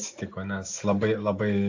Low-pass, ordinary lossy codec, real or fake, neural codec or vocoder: 7.2 kHz; AAC, 48 kbps; real; none